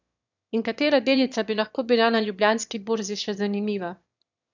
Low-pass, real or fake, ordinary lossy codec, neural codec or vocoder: 7.2 kHz; fake; none; autoencoder, 22.05 kHz, a latent of 192 numbers a frame, VITS, trained on one speaker